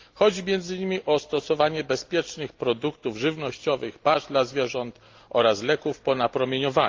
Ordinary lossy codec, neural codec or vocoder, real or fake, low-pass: Opus, 32 kbps; none; real; 7.2 kHz